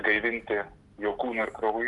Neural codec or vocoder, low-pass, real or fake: none; 10.8 kHz; real